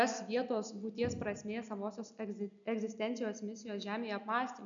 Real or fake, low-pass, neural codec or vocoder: real; 7.2 kHz; none